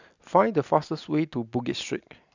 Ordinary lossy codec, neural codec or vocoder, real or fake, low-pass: none; none; real; 7.2 kHz